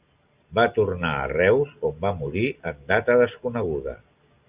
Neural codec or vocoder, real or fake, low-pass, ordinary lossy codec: none; real; 3.6 kHz; Opus, 64 kbps